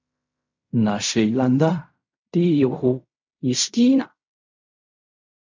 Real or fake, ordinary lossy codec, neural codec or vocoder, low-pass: fake; MP3, 64 kbps; codec, 16 kHz in and 24 kHz out, 0.4 kbps, LongCat-Audio-Codec, fine tuned four codebook decoder; 7.2 kHz